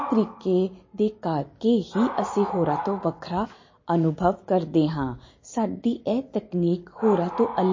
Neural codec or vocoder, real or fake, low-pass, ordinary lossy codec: none; real; 7.2 kHz; MP3, 32 kbps